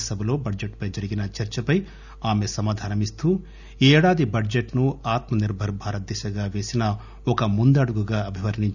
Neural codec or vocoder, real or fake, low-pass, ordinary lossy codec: none; real; 7.2 kHz; none